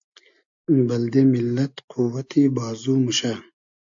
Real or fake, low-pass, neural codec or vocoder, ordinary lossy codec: real; 7.2 kHz; none; AAC, 64 kbps